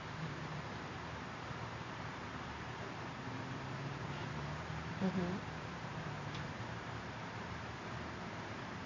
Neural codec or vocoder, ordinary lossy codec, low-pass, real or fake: vocoder, 44.1 kHz, 128 mel bands every 512 samples, BigVGAN v2; AAC, 48 kbps; 7.2 kHz; fake